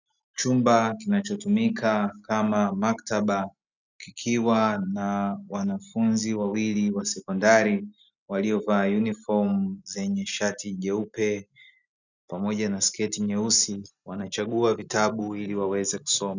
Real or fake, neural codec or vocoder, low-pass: real; none; 7.2 kHz